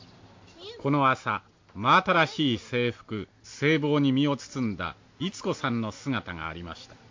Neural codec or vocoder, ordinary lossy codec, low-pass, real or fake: none; MP3, 48 kbps; 7.2 kHz; real